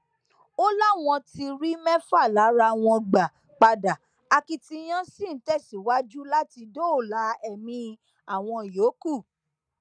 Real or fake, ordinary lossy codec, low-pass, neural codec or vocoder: real; none; 9.9 kHz; none